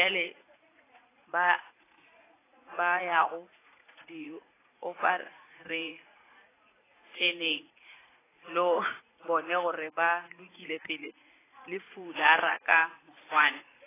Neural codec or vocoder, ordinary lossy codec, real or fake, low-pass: vocoder, 44.1 kHz, 80 mel bands, Vocos; AAC, 16 kbps; fake; 3.6 kHz